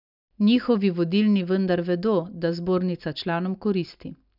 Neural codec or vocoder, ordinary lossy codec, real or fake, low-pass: none; none; real; 5.4 kHz